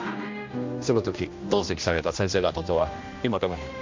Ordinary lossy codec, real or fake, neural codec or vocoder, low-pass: MP3, 48 kbps; fake; codec, 16 kHz, 1 kbps, X-Codec, HuBERT features, trained on general audio; 7.2 kHz